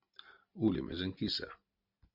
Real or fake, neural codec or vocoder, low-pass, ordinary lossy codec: real; none; 5.4 kHz; MP3, 48 kbps